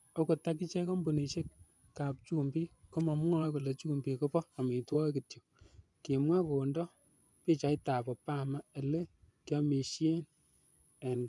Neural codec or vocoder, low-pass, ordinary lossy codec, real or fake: vocoder, 24 kHz, 100 mel bands, Vocos; none; none; fake